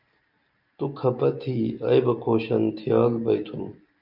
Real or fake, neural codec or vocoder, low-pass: real; none; 5.4 kHz